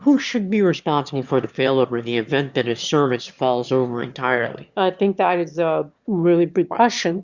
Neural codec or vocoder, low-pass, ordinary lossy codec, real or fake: autoencoder, 22.05 kHz, a latent of 192 numbers a frame, VITS, trained on one speaker; 7.2 kHz; Opus, 64 kbps; fake